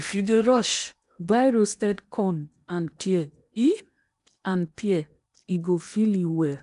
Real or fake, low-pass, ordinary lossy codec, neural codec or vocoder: fake; 10.8 kHz; none; codec, 16 kHz in and 24 kHz out, 0.8 kbps, FocalCodec, streaming, 65536 codes